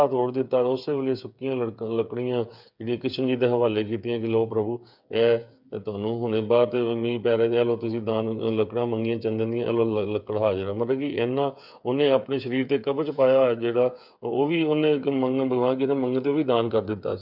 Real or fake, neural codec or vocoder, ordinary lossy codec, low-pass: fake; codec, 16 kHz, 8 kbps, FreqCodec, smaller model; none; 5.4 kHz